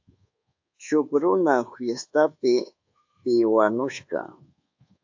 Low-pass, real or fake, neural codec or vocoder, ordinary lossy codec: 7.2 kHz; fake; codec, 24 kHz, 1.2 kbps, DualCodec; AAC, 48 kbps